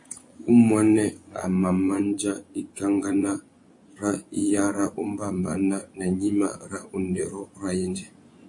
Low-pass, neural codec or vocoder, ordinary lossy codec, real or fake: 10.8 kHz; vocoder, 24 kHz, 100 mel bands, Vocos; AAC, 64 kbps; fake